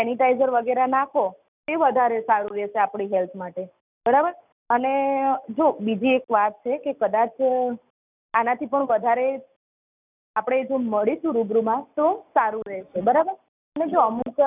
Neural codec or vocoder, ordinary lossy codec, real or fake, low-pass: none; none; real; 3.6 kHz